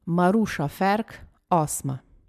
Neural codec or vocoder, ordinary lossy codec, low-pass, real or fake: none; MP3, 96 kbps; 14.4 kHz; real